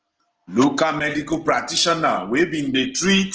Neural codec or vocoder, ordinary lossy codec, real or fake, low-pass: none; Opus, 16 kbps; real; 7.2 kHz